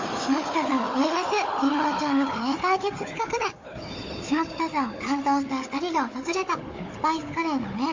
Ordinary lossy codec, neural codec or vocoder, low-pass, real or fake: MP3, 48 kbps; codec, 16 kHz, 4 kbps, FunCodec, trained on Chinese and English, 50 frames a second; 7.2 kHz; fake